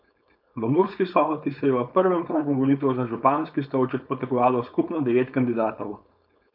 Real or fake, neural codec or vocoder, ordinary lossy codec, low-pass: fake; codec, 16 kHz, 4.8 kbps, FACodec; none; 5.4 kHz